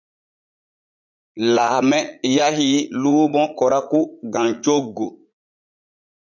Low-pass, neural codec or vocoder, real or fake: 7.2 kHz; vocoder, 44.1 kHz, 80 mel bands, Vocos; fake